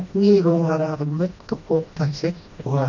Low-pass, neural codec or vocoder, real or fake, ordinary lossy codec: 7.2 kHz; codec, 16 kHz, 1 kbps, FreqCodec, smaller model; fake; none